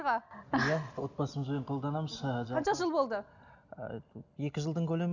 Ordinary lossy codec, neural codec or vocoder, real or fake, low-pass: none; none; real; 7.2 kHz